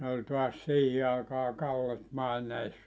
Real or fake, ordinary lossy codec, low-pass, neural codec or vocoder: real; none; none; none